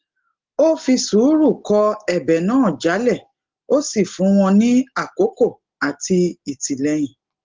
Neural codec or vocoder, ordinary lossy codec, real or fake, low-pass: none; Opus, 16 kbps; real; 7.2 kHz